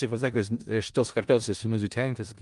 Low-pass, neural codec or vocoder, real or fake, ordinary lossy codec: 10.8 kHz; codec, 16 kHz in and 24 kHz out, 0.4 kbps, LongCat-Audio-Codec, four codebook decoder; fake; Opus, 32 kbps